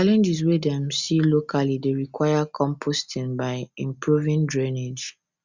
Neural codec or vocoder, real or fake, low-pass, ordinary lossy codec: none; real; 7.2 kHz; Opus, 64 kbps